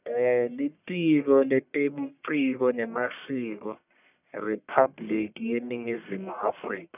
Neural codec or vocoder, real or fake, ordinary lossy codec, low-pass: codec, 44.1 kHz, 1.7 kbps, Pupu-Codec; fake; none; 3.6 kHz